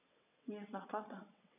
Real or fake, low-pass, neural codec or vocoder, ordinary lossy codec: fake; 3.6 kHz; vocoder, 22.05 kHz, 80 mel bands, WaveNeXt; none